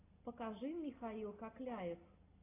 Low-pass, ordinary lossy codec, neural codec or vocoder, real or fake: 3.6 kHz; AAC, 16 kbps; none; real